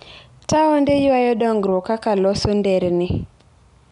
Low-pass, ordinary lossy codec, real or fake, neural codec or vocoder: 10.8 kHz; none; real; none